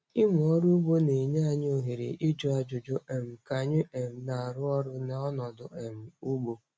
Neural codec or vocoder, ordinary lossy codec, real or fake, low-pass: none; none; real; none